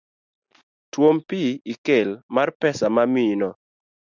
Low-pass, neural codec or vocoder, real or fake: 7.2 kHz; none; real